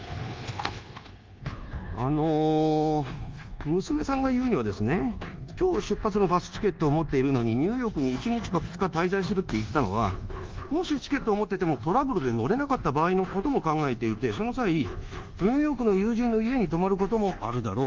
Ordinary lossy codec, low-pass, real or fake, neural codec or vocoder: Opus, 32 kbps; 7.2 kHz; fake; codec, 24 kHz, 1.2 kbps, DualCodec